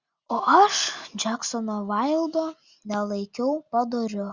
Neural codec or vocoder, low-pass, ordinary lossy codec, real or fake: none; 7.2 kHz; Opus, 64 kbps; real